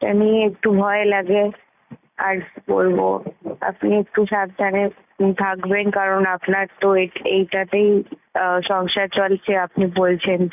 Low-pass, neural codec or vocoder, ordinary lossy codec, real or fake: 3.6 kHz; none; none; real